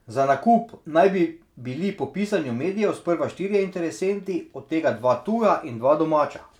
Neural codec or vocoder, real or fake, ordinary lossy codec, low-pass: none; real; none; 19.8 kHz